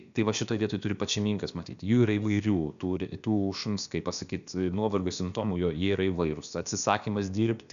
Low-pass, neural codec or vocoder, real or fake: 7.2 kHz; codec, 16 kHz, about 1 kbps, DyCAST, with the encoder's durations; fake